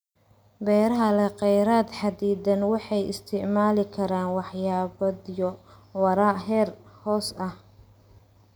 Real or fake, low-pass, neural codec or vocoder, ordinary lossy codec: real; none; none; none